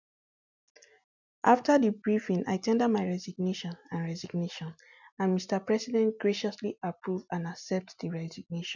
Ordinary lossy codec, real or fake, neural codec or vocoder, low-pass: none; real; none; 7.2 kHz